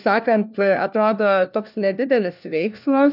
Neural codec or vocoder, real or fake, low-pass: codec, 16 kHz, 1 kbps, FunCodec, trained on LibriTTS, 50 frames a second; fake; 5.4 kHz